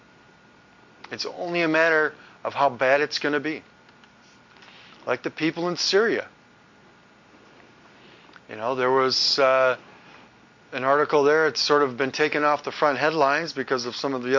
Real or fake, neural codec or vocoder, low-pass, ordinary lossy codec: real; none; 7.2 kHz; MP3, 48 kbps